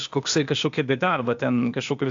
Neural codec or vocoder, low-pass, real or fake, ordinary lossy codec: codec, 16 kHz, 0.8 kbps, ZipCodec; 7.2 kHz; fake; AAC, 64 kbps